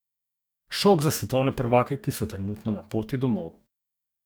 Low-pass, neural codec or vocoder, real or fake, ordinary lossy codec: none; codec, 44.1 kHz, 2.6 kbps, DAC; fake; none